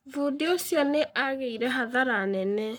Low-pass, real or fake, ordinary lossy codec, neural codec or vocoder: none; fake; none; codec, 44.1 kHz, 7.8 kbps, Pupu-Codec